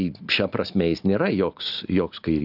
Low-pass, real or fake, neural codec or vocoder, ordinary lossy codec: 5.4 kHz; real; none; AAC, 48 kbps